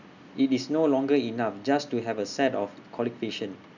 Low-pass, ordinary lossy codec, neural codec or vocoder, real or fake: 7.2 kHz; none; none; real